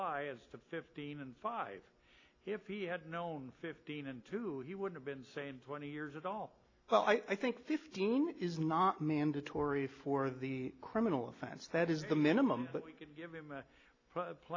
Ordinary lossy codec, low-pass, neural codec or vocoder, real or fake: AAC, 32 kbps; 7.2 kHz; none; real